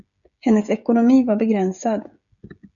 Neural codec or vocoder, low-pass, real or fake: codec, 16 kHz, 16 kbps, FreqCodec, smaller model; 7.2 kHz; fake